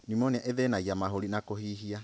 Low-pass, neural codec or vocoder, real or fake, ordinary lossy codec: none; none; real; none